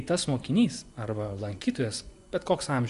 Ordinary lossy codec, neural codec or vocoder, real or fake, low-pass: Opus, 64 kbps; vocoder, 24 kHz, 100 mel bands, Vocos; fake; 10.8 kHz